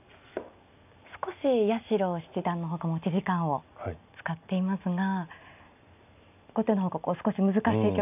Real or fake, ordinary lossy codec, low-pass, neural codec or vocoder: real; none; 3.6 kHz; none